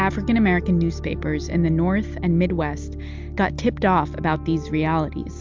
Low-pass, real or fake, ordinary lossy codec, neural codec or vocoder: 7.2 kHz; real; MP3, 64 kbps; none